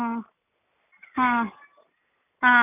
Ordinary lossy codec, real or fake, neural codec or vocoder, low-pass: none; real; none; 3.6 kHz